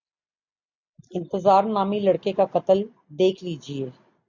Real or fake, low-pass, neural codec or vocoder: real; 7.2 kHz; none